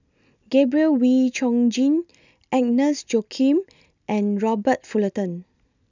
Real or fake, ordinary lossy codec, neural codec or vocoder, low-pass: real; none; none; 7.2 kHz